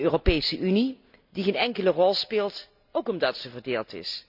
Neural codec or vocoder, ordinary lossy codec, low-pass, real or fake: none; none; 5.4 kHz; real